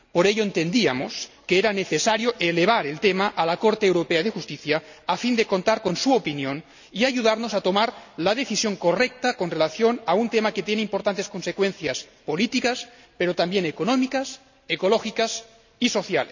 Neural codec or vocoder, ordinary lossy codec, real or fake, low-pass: none; none; real; 7.2 kHz